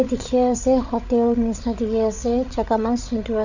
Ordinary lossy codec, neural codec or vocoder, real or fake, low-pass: none; codec, 16 kHz, 8 kbps, FreqCodec, larger model; fake; 7.2 kHz